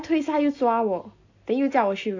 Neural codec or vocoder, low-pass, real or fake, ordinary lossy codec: none; 7.2 kHz; real; AAC, 48 kbps